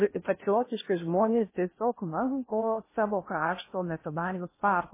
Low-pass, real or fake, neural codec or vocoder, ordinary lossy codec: 3.6 kHz; fake; codec, 16 kHz in and 24 kHz out, 0.6 kbps, FocalCodec, streaming, 4096 codes; MP3, 16 kbps